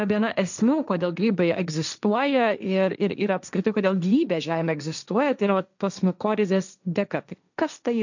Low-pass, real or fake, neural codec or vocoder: 7.2 kHz; fake; codec, 16 kHz, 1.1 kbps, Voila-Tokenizer